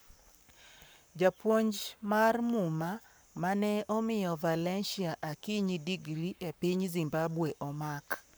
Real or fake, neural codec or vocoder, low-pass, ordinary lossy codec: fake; codec, 44.1 kHz, 7.8 kbps, Pupu-Codec; none; none